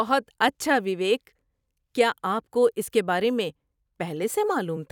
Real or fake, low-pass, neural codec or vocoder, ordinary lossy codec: fake; 19.8 kHz; vocoder, 44.1 kHz, 128 mel bands every 256 samples, BigVGAN v2; none